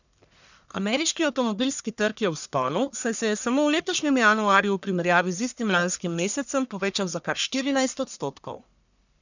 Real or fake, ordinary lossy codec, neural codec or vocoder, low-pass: fake; none; codec, 44.1 kHz, 1.7 kbps, Pupu-Codec; 7.2 kHz